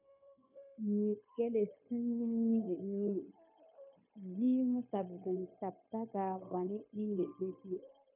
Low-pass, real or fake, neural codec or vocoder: 3.6 kHz; fake; codec, 16 kHz, 0.9 kbps, LongCat-Audio-Codec